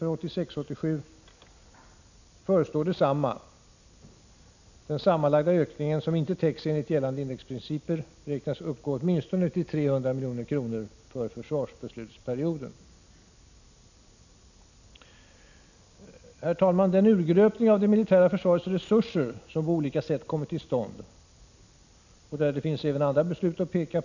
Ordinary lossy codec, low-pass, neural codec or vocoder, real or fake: none; 7.2 kHz; none; real